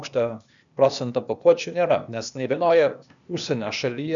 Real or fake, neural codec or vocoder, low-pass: fake; codec, 16 kHz, 0.8 kbps, ZipCodec; 7.2 kHz